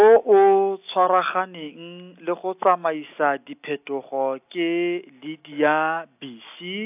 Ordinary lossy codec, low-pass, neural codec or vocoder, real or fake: AAC, 32 kbps; 3.6 kHz; none; real